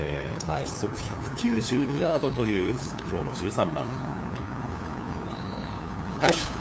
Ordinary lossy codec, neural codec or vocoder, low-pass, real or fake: none; codec, 16 kHz, 2 kbps, FunCodec, trained on LibriTTS, 25 frames a second; none; fake